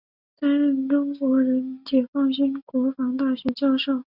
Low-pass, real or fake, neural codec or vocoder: 5.4 kHz; real; none